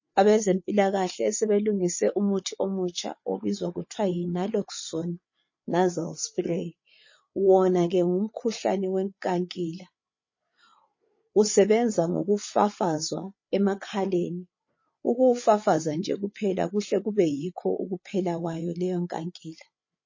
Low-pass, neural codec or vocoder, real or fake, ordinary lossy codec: 7.2 kHz; codec, 16 kHz, 8 kbps, FreqCodec, larger model; fake; MP3, 32 kbps